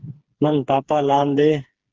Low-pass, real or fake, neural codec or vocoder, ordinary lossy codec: 7.2 kHz; fake; codec, 16 kHz, 4 kbps, FreqCodec, smaller model; Opus, 16 kbps